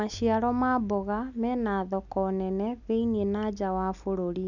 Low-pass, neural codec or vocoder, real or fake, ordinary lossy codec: 7.2 kHz; none; real; none